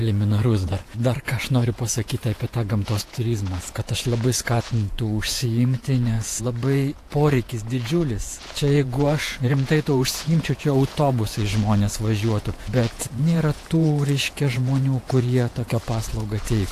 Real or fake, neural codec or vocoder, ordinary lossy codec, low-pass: fake; vocoder, 48 kHz, 128 mel bands, Vocos; AAC, 64 kbps; 14.4 kHz